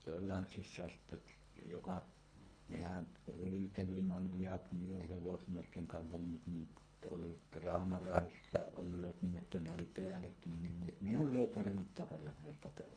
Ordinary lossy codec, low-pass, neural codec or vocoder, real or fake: none; 9.9 kHz; codec, 24 kHz, 1.5 kbps, HILCodec; fake